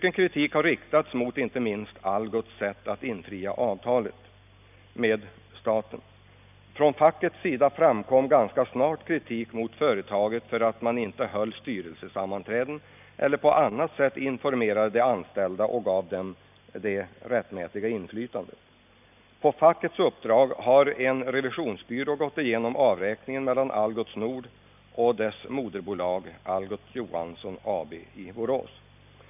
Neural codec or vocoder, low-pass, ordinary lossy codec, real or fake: none; 3.6 kHz; none; real